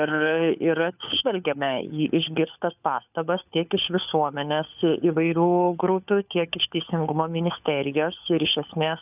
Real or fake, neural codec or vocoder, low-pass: fake; codec, 16 kHz, 4 kbps, FunCodec, trained on LibriTTS, 50 frames a second; 3.6 kHz